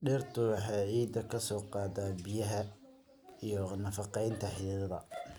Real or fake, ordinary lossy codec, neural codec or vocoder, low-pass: real; none; none; none